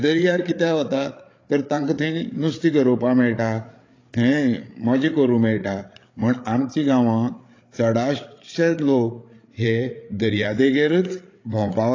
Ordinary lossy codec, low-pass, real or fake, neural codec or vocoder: AAC, 32 kbps; 7.2 kHz; fake; codec, 16 kHz, 8 kbps, FreqCodec, larger model